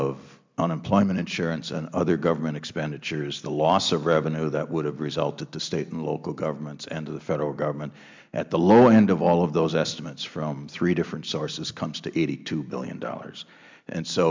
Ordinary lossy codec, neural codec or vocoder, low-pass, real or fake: MP3, 64 kbps; none; 7.2 kHz; real